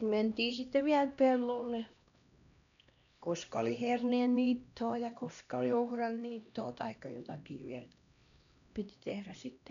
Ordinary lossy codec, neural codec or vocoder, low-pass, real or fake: none; codec, 16 kHz, 1 kbps, X-Codec, HuBERT features, trained on LibriSpeech; 7.2 kHz; fake